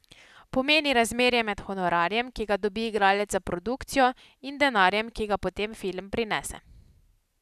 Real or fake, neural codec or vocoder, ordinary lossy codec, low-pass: real; none; none; 14.4 kHz